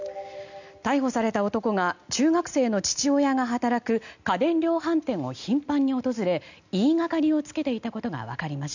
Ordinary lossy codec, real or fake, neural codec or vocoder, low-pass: none; real; none; 7.2 kHz